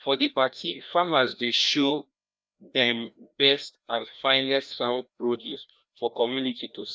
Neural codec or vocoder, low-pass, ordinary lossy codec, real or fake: codec, 16 kHz, 1 kbps, FreqCodec, larger model; none; none; fake